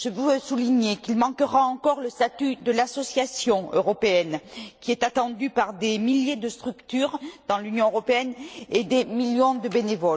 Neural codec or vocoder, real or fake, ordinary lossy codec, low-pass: none; real; none; none